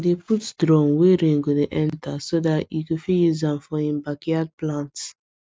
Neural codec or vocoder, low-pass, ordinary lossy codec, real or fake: none; none; none; real